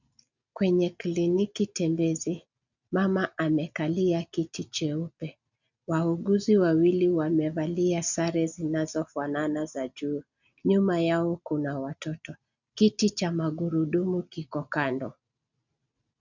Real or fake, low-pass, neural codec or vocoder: real; 7.2 kHz; none